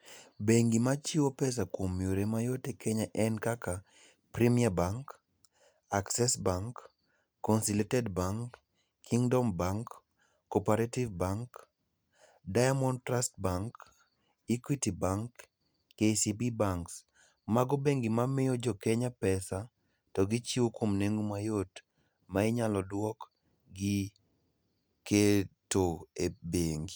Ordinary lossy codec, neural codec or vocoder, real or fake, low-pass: none; none; real; none